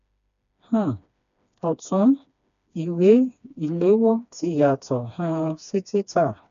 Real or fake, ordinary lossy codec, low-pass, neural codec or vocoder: fake; none; 7.2 kHz; codec, 16 kHz, 2 kbps, FreqCodec, smaller model